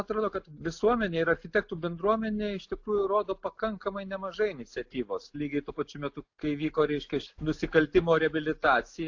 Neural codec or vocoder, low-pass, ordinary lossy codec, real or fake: none; 7.2 kHz; AAC, 48 kbps; real